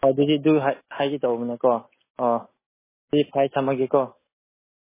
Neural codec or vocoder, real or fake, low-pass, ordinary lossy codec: none; real; 3.6 kHz; MP3, 16 kbps